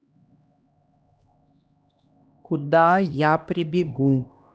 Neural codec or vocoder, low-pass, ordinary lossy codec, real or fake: codec, 16 kHz, 1 kbps, X-Codec, HuBERT features, trained on LibriSpeech; none; none; fake